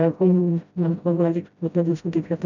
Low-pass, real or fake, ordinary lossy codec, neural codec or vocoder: 7.2 kHz; fake; none; codec, 16 kHz, 0.5 kbps, FreqCodec, smaller model